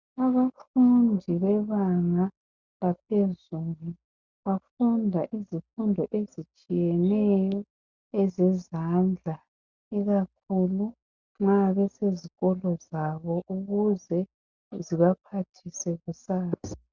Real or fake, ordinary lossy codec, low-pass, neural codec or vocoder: real; Opus, 64 kbps; 7.2 kHz; none